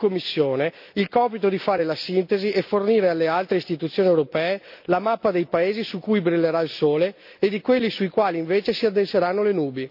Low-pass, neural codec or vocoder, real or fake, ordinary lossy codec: 5.4 kHz; none; real; AAC, 48 kbps